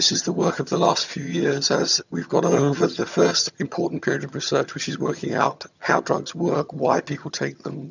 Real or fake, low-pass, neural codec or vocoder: fake; 7.2 kHz; vocoder, 22.05 kHz, 80 mel bands, HiFi-GAN